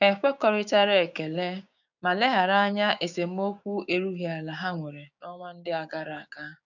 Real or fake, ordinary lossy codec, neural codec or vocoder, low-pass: fake; none; codec, 16 kHz, 6 kbps, DAC; 7.2 kHz